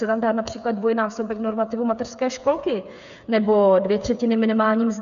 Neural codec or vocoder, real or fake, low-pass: codec, 16 kHz, 8 kbps, FreqCodec, smaller model; fake; 7.2 kHz